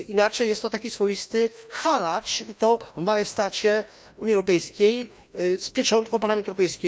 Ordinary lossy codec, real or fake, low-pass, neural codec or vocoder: none; fake; none; codec, 16 kHz, 1 kbps, FreqCodec, larger model